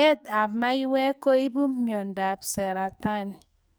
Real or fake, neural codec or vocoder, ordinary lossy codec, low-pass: fake; codec, 44.1 kHz, 2.6 kbps, SNAC; none; none